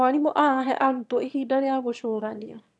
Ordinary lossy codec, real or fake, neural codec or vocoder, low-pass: none; fake; autoencoder, 22.05 kHz, a latent of 192 numbers a frame, VITS, trained on one speaker; none